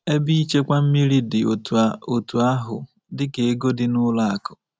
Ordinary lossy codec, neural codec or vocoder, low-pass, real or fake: none; none; none; real